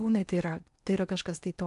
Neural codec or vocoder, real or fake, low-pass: codec, 16 kHz in and 24 kHz out, 0.8 kbps, FocalCodec, streaming, 65536 codes; fake; 10.8 kHz